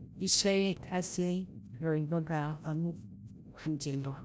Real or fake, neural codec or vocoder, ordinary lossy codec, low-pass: fake; codec, 16 kHz, 0.5 kbps, FreqCodec, larger model; none; none